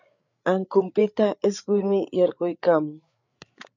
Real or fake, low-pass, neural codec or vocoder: fake; 7.2 kHz; codec, 16 kHz, 8 kbps, FreqCodec, larger model